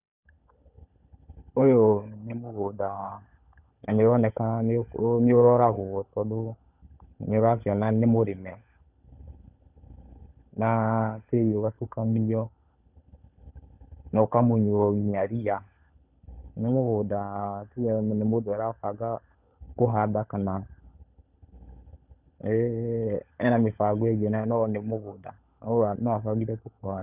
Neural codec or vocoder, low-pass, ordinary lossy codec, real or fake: codec, 16 kHz, 16 kbps, FunCodec, trained on LibriTTS, 50 frames a second; 3.6 kHz; none; fake